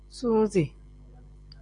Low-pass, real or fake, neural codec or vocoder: 9.9 kHz; real; none